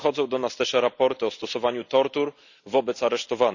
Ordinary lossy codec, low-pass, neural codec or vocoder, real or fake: none; 7.2 kHz; none; real